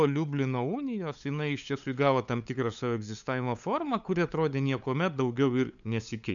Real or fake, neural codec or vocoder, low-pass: fake; codec, 16 kHz, 8 kbps, FunCodec, trained on LibriTTS, 25 frames a second; 7.2 kHz